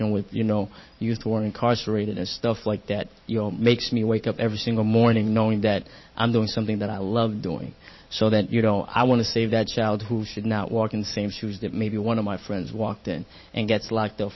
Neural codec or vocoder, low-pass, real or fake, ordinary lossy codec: vocoder, 44.1 kHz, 128 mel bands every 512 samples, BigVGAN v2; 7.2 kHz; fake; MP3, 24 kbps